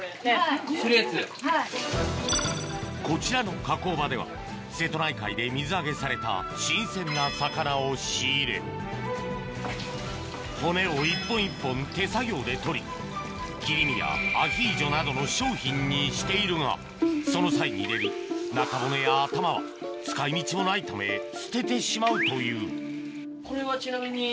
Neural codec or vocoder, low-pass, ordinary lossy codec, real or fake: none; none; none; real